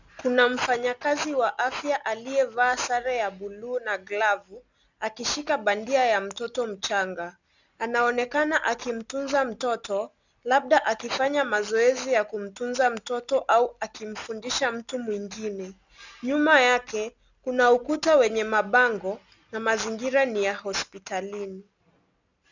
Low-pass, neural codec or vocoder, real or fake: 7.2 kHz; none; real